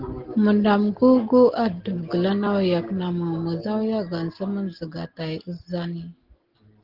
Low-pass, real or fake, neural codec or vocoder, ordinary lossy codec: 5.4 kHz; real; none; Opus, 16 kbps